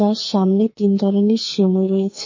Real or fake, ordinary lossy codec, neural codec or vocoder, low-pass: fake; MP3, 32 kbps; codec, 44.1 kHz, 2.6 kbps, SNAC; 7.2 kHz